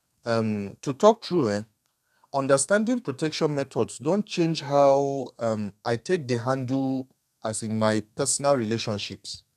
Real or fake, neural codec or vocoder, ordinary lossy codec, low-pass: fake; codec, 32 kHz, 1.9 kbps, SNAC; none; 14.4 kHz